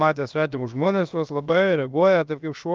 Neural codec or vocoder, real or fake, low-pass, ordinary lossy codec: codec, 16 kHz, about 1 kbps, DyCAST, with the encoder's durations; fake; 7.2 kHz; Opus, 32 kbps